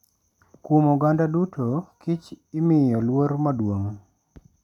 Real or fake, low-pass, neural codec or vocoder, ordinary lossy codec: real; 19.8 kHz; none; none